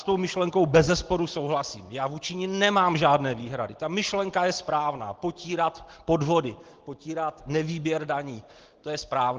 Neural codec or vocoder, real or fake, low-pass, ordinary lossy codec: none; real; 7.2 kHz; Opus, 16 kbps